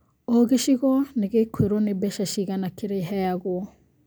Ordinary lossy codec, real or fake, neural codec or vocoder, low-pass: none; real; none; none